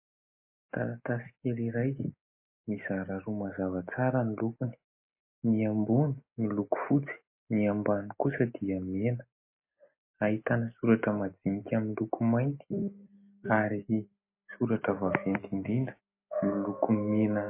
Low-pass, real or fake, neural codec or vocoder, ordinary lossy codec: 3.6 kHz; real; none; MP3, 24 kbps